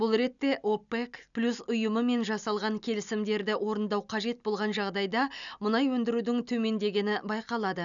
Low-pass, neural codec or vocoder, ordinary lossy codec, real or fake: 7.2 kHz; none; none; real